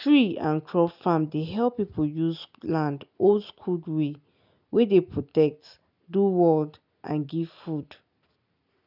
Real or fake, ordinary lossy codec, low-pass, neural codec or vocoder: real; none; 5.4 kHz; none